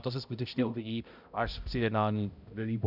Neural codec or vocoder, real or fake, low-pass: codec, 16 kHz, 0.5 kbps, X-Codec, HuBERT features, trained on balanced general audio; fake; 5.4 kHz